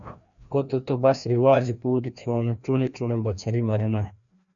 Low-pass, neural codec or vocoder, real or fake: 7.2 kHz; codec, 16 kHz, 1 kbps, FreqCodec, larger model; fake